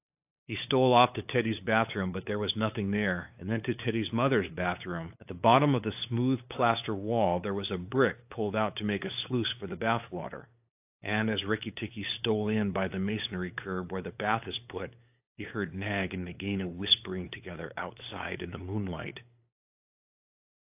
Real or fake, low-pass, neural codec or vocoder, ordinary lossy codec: fake; 3.6 kHz; codec, 16 kHz, 8 kbps, FunCodec, trained on LibriTTS, 25 frames a second; AAC, 32 kbps